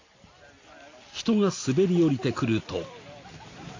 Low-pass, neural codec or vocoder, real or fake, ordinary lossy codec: 7.2 kHz; none; real; AAC, 32 kbps